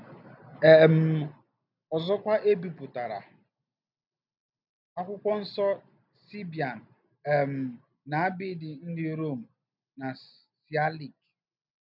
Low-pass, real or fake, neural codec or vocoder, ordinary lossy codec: 5.4 kHz; real; none; none